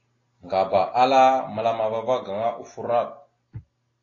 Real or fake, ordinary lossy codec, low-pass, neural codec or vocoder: real; AAC, 32 kbps; 7.2 kHz; none